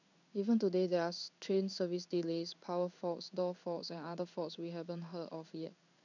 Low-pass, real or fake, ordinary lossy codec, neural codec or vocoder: 7.2 kHz; fake; none; codec, 16 kHz in and 24 kHz out, 1 kbps, XY-Tokenizer